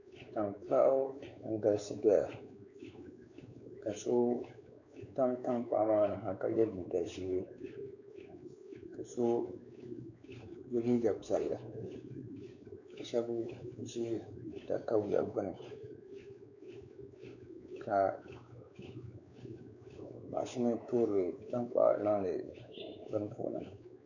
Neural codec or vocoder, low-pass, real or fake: codec, 16 kHz, 4 kbps, X-Codec, WavLM features, trained on Multilingual LibriSpeech; 7.2 kHz; fake